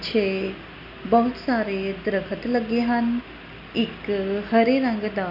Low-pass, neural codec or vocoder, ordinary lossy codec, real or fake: 5.4 kHz; none; none; real